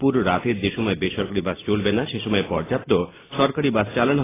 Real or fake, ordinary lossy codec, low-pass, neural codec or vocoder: real; AAC, 16 kbps; 3.6 kHz; none